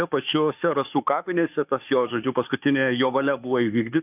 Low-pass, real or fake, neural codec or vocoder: 3.6 kHz; fake; autoencoder, 48 kHz, 32 numbers a frame, DAC-VAE, trained on Japanese speech